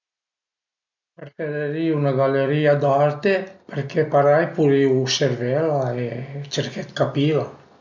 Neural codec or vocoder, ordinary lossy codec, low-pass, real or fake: none; none; 7.2 kHz; real